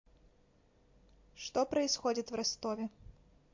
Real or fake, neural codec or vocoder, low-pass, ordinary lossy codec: real; none; 7.2 kHz; MP3, 48 kbps